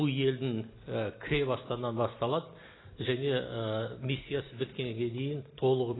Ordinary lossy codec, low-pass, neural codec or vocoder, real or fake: AAC, 16 kbps; 7.2 kHz; none; real